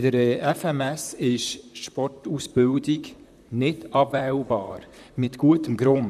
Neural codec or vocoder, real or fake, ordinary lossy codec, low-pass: vocoder, 44.1 kHz, 128 mel bands, Pupu-Vocoder; fake; none; 14.4 kHz